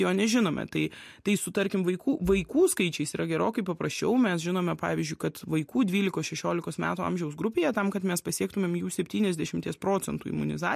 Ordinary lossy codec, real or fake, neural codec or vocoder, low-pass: MP3, 64 kbps; real; none; 14.4 kHz